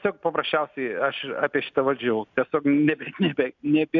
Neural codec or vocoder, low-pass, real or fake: none; 7.2 kHz; real